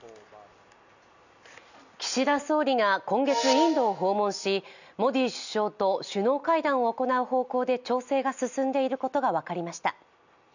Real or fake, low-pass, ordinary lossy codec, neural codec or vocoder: real; 7.2 kHz; none; none